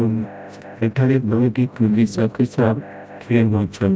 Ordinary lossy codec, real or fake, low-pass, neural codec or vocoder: none; fake; none; codec, 16 kHz, 0.5 kbps, FreqCodec, smaller model